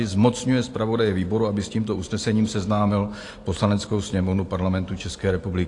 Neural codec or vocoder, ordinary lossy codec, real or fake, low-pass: none; AAC, 48 kbps; real; 10.8 kHz